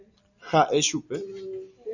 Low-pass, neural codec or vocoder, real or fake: 7.2 kHz; none; real